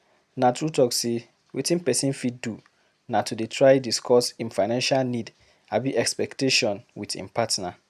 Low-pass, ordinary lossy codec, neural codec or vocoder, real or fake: 14.4 kHz; none; none; real